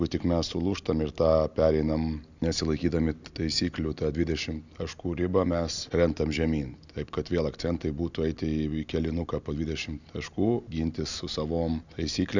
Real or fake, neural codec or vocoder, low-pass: real; none; 7.2 kHz